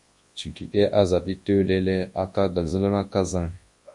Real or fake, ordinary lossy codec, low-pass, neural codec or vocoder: fake; MP3, 48 kbps; 10.8 kHz; codec, 24 kHz, 0.9 kbps, WavTokenizer, large speech release